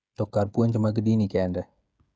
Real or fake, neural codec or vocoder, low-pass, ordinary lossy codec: fake; codec, 16 kHz, 16 kbps, FreqCodec, smaller model; none; none